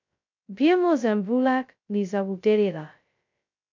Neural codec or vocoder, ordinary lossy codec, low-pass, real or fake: codec, 16 kHz, 0.2 kbps, FocalCodec; MP3, 64 kbps; 7.2 kHz; fake